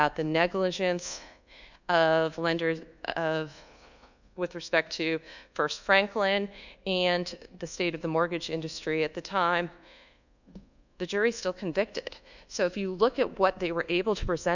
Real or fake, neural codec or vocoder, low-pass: fake; codec, 24 kHz, 1.2 kbps, DualCodec; 7.2 kHz